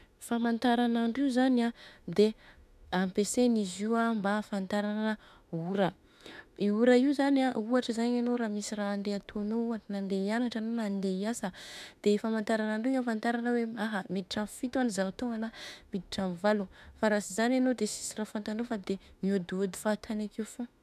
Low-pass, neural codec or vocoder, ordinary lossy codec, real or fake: 14.4 kHz; autoencoder, 48 kHz, 32 numbers a frame, DAC-VAE, trained on Japanese speech; none; fake